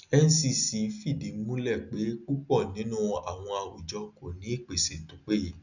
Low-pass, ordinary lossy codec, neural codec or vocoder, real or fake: 7.2 kHz; none; none; real